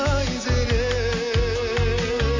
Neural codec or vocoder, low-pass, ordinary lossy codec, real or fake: none; 7.2 kHz; AAC, 32 kbps; real